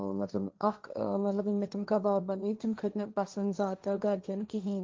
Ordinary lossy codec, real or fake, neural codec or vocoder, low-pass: Opus, 32 kbps; fake; codec, 16 kHz, 1.1 kbps, Voila-Tokenizer; 7.2 kHz